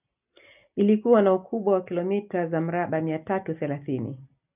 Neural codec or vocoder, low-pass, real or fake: none; 3.6 kHz; real